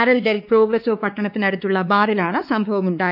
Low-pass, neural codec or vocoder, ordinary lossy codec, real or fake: 5.4 kHz; codec, 16 kHz, 2 kbps, X-Codec, WavLM features, trained on Multilingual LibriSpeech; none; fake